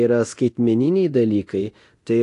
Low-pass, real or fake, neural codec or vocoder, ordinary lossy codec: 10.8 kHz; fake; codec, 24 kHz, 0.9 kbps, DualCodec; AAC, 48 kbps